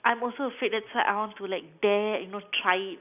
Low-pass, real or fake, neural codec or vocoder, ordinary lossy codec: 3.6 kHz; real; none; none